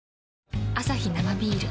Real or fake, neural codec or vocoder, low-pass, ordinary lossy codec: real; none; none; none